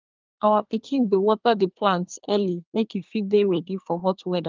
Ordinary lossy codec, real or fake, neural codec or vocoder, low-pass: Opus, 32 kbps; fake; codec, 24 kHz, 1 kbps, SNAC; 7.2 kHz